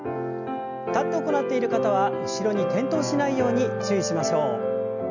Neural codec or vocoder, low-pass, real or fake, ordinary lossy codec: none; 7.2 kHz; real; none